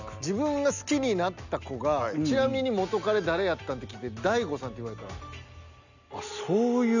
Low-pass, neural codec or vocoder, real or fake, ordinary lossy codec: 7.2 kHz; none; real; none